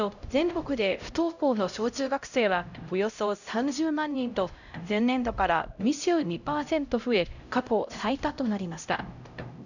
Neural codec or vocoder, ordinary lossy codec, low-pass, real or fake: codec, 16 kHz, 0.5 kbps, X-Codec, HuBERT features, trained on LibriSpeech; none; 7.2 kHz; fake